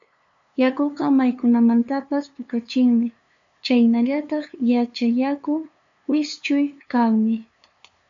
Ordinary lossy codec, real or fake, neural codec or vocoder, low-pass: MP3, 64 kbps; fake; codec, 16 kHz, 2 kbps, FunCodec, trained on LibriTTS, 25 frames a second; 7.2 kHz